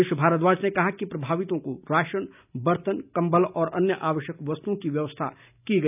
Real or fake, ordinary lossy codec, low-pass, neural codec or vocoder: real; none; 3.6 kHz; none